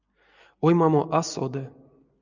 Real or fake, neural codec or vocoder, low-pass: real; none; 7.2 kHz